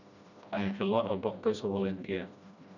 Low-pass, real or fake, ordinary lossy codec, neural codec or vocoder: 7.2 kHz; fake; none; codec, 16 kHz, 1 kbps, FreqCodec, smaller model